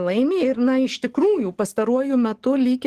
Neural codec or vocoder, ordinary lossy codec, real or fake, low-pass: codec, 44.1 kHz, 7.8 kbps, DAC; Opus, 16 kbps; fake; 14.4 kHz